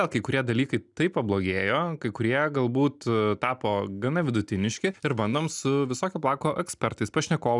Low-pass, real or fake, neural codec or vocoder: 10.8 kHz; real; none